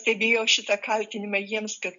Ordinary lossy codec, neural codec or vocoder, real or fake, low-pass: MP3, 64 kbps; none; real; 7.2 kHz